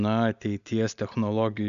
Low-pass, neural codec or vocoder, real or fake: 7.2 kHz; none; real